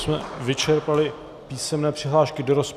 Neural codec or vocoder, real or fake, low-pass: none; real; 14.4 kHz